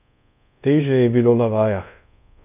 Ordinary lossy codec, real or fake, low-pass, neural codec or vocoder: none; fake; 3.6 kHz; codec, 24 kHz, 0.5 kbps, DualCodec